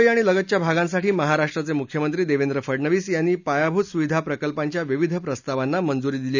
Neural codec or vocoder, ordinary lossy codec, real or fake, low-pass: none; none; real; 7.2 kHz